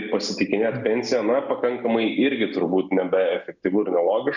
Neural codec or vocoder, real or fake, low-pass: none; real; 7.2 kHz